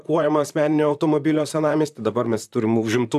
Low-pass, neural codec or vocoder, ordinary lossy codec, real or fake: 14.4 kHz; vocoder, 44.1 kHz, 128 mel bands, Pupu-Vocoder; AAC, 96 kbps; fake